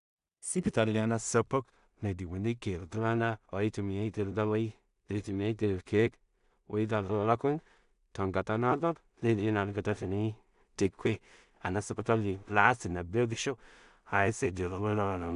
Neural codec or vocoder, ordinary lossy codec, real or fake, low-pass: codec, 16 kHz in and 24 kHz out, 0.4 kbps, LongCat-Audio-Codec, two codebook decoder; none; fake; 10.8 kHz